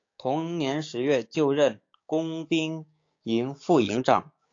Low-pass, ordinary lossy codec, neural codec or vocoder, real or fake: 7.2 kHz; AAC, 48 kbps; codec, 16 kHz, 6 kbps, DAC; fake